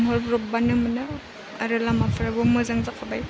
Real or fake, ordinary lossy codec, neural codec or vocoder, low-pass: real; none; none; none